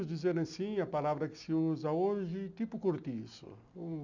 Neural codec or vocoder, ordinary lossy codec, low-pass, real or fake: none; none; 7.2 kHz; real